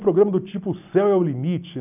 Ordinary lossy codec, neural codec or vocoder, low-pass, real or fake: none; none; 3.6 kHz; real